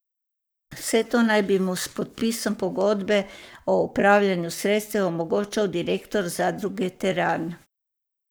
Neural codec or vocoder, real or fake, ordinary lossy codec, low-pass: codec, 44.1 kHz, 7.8 kbps, Pupu-Codec; fake; none; none